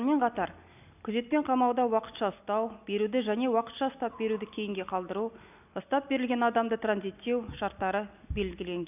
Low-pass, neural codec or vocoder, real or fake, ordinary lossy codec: 3.6 kHz; none; real; none